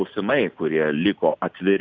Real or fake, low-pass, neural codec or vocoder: real; 7.2 kHz; none